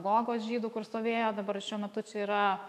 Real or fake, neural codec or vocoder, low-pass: fake; autoencoder, 48 kHz, 128 numbers a frame, DAC-VAE, trained on Japanese speech; 14.4 kHz